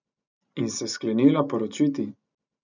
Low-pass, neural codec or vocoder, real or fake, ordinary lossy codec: 7.2 kHz; none; real; none